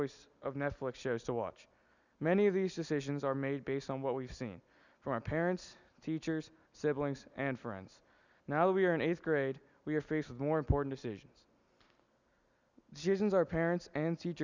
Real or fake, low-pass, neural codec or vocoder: real; 7.2 kHz; none